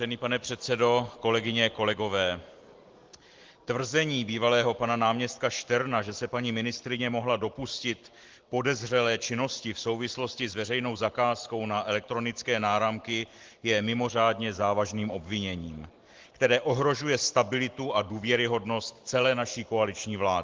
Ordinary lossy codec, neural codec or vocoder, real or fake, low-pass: Opus, 16 kbps; none; real; 7.2 kHz